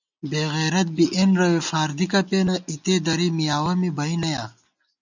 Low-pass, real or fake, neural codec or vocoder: 7.2 kHz; real; none